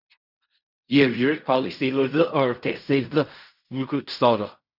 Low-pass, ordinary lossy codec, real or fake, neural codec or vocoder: 5.4 kHz; none; fake; codec, 16 kHz in and 24 kHz out, 0.4 kbps, LongCat-Audio-Codec, fine tuned four codebook decoder